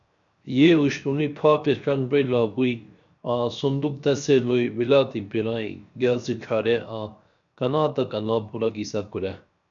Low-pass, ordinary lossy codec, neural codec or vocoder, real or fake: 7.2 kHz; MP3, 96 kbps; codec, 16 kHz, 0.7 kbps, FocalCodec; fake